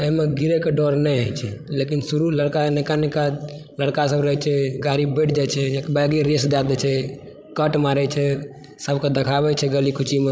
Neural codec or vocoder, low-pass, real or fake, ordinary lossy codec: codec, 16 kHz, 16 kbps, FreqCodec, larger model; none; fake; none